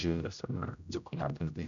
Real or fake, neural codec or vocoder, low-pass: fake; codec, 16 kHz, 0.5 kbps, X-Codec, HuBERT features, trained on general audio; 7.2 kHz